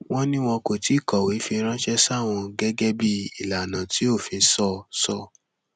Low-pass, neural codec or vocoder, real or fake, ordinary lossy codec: 9.9 kHz; none; real; none